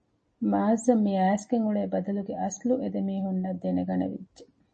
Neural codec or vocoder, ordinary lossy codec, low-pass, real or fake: none; MP3, 32 kbps; 9.9 kHz; real